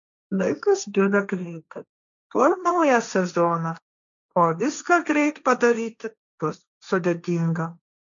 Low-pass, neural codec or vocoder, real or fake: 7.2 kHz; codec, 16 kHz, 1.1 kbps, Voila-Tokenizer; fake